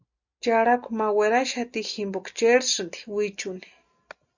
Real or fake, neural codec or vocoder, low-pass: real; none; 7.2 kHz